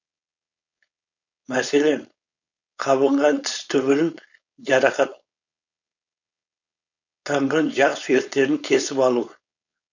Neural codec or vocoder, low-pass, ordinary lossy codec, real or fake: codec, 16 kHz, 4.8 kbps, FACodec; 7.2 kHz; none; fake